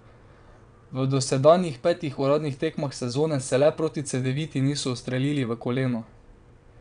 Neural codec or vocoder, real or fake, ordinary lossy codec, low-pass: vocoder, 22.05 kHz, 80 mel bands, WaveNeXt; fake; none; 9.9 kHz